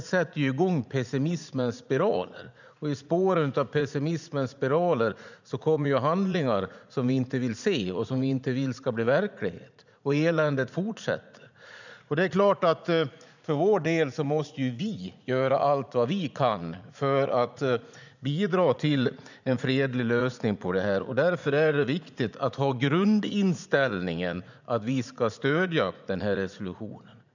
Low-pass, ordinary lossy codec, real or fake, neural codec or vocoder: 7.2 kHz; none; fake; vocoder, 44.1 kHz, 80 mel bands, Vocos